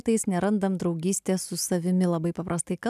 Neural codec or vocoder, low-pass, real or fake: none; 14.4 kHz; real